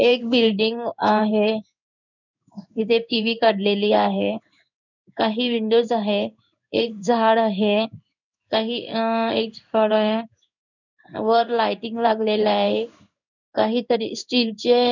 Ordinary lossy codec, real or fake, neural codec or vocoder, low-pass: none; fake; codec, 16 kHz in and 24 kHz out, 1 kbps, XY-Tokenizer; 7.2 kHz